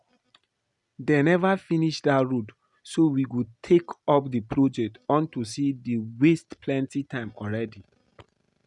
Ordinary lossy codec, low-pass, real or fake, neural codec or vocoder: none; 10.8 kHz; real; none